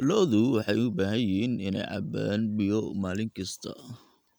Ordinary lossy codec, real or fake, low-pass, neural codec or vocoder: none; real; none; none